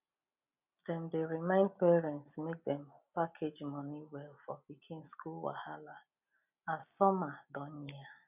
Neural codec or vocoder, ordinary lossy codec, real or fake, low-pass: none; none; real; 3.6 kHz